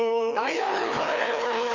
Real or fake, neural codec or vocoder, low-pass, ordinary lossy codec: fake; codec, 16 kHz, 2 kbps, FreqCodec, larger model; 7.2 kHz; none